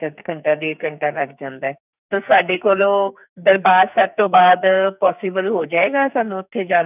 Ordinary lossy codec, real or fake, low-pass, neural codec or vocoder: none; fake; 3.6 kHz; codec, 44.1 kHz, 2.6 kbps, SNAC